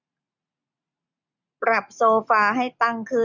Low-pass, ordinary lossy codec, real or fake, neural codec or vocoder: 7.2 kHz; none; real; none